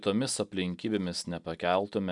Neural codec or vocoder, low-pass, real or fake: none; 10.8 kHz; real